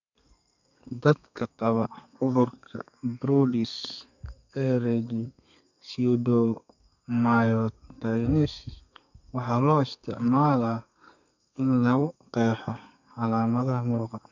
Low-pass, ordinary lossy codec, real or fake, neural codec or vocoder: 7.2 kHz; none; fake; codec, 32 kHz, 1.9 kbps, SNAC